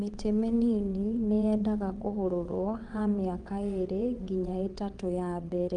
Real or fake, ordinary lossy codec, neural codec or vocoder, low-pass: fake; Opus, 32 kbps; vocoder, 22.05 kHz, 80 mel bands, WaveNeXt; 9.9 kHz